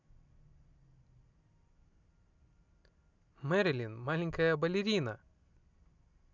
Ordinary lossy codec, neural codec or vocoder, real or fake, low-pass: none; none; real; 7.2 kHz